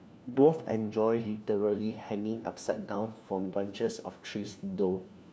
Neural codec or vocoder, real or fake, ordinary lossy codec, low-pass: codec, 16 kHz, 1 kbps, FunCodec, trained on LibriTTS, 50 frames a second; fake; none; none